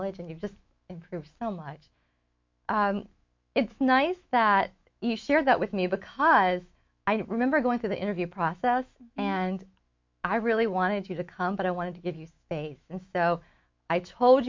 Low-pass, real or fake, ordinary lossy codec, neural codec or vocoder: 7.2 kHz; fake; MP3, 48 kbps; autoencoder, 48 kHz, 128 numbers a frame, DAC-VAE, trained on Japanese speech